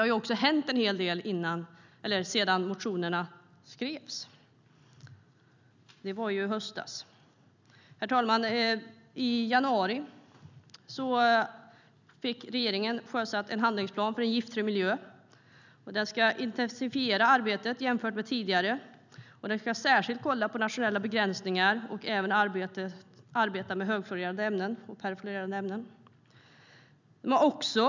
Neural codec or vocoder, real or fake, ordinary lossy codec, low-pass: none; real; none; 7.2 kHz